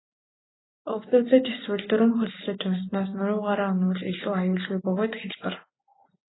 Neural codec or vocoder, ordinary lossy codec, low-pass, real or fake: none; AAC, 16 kbps; 7.2 kHz; real